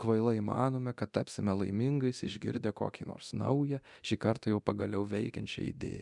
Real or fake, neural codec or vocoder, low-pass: fake; codec, 24 kHz, 0.9 kbps, DualCodec; 10.8 kHz